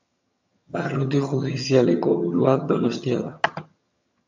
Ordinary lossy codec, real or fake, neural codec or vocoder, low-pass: MP3, 64 kbps; fake; vocoder, 22.05 kHz, 80 mel bands, HiFi-GAN; 7.2 kHz